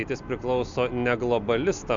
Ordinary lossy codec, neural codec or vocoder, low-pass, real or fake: MP3, 64 kbps; none; 7.2 kHz; real